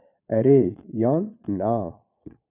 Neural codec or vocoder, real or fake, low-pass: vocoder, 22.05 kHz, 80 mel bands, Vocos; fake; 3.6 kHz